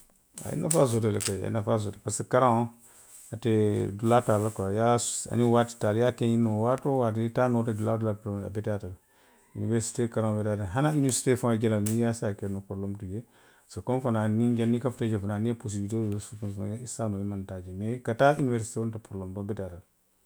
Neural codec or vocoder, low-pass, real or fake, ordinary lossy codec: autoencoder, 48 kHz, 128 numbers a frame, DAC-VAE, trained on Japanese speech; none; fake; none